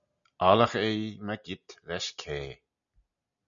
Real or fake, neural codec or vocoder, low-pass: real; none; 7.2 kHz